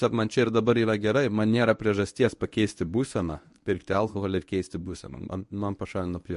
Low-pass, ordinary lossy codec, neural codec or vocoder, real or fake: 10.8 kHz; MP3, 48 kbps; codec, 24 kHz, 0.9 kbps, WavTokenizer, medium speech release version 1; fake